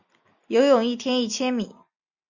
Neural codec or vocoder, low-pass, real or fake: none; 7.2 kHz; real